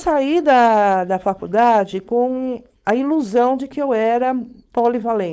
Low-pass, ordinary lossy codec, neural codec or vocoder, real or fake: none; none; codec, 16 kHz, 4.8 kbps, FACodec; fake